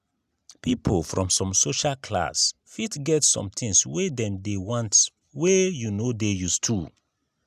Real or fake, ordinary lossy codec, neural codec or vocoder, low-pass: real; none; none; 14.4 kHz